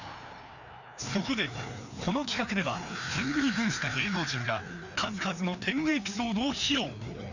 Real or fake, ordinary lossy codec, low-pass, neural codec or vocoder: fake; none; 7.2 kHz; codec, 16 kHz, 2 kbps, FreqCodec, larger model